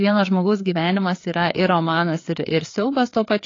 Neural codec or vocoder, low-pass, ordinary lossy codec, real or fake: codec, 16 kHz, 4 kbps, FreqCodec, larger model; 7.2 kHz; AAC, 32 kbps; fake